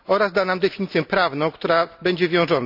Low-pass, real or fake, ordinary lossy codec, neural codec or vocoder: 5.4 kHz; real; none; none